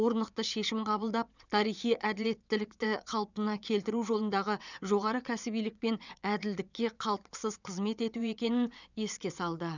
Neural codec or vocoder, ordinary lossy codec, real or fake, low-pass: vocoder, 22.05 kHz, 80 mel bands, WaveNeXt; none; fake; 7.2 kHz